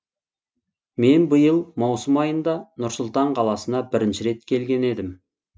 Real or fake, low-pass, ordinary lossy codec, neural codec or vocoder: real; none; none; none